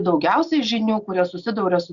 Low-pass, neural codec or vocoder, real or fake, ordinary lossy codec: 7.2 kHz; none; real; Opus, 64 kbps